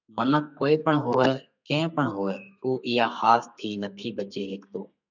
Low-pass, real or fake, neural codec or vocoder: 7.2 kHz; fake; codec, 32 kHz, 1.9 kbps, SNAC